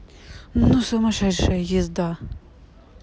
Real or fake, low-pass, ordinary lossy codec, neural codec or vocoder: real; none; none; none